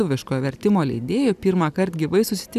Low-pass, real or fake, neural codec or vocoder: 14.4 kHz; real; none